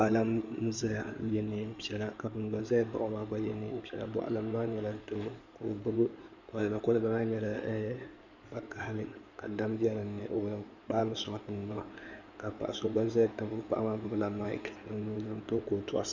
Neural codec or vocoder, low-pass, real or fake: codec, 16 kHz in and 24 kHz out, 2.2 kbps, FireRedTTS-2 codec; 7.2 kHz; fake